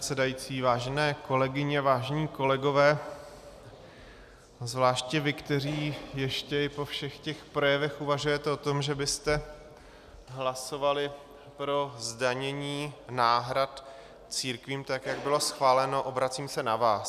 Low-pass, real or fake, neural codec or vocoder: 14.4 kHz; real; none